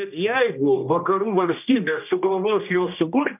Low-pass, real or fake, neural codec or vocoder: 3.6 kHz; fake; codec, 16 kHz, 1 kbps, X-Codec, HuBERT features, trained on general audio